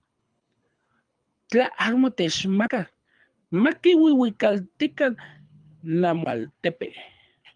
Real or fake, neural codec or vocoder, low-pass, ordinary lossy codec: fake; codec, 24 kHz, 6 kbps, HILCodec; 9.9 kHz; Opus, 32 kbps